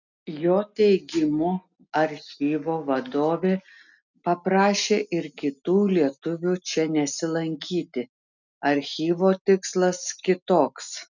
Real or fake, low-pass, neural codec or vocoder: real; 7.2 kHz; none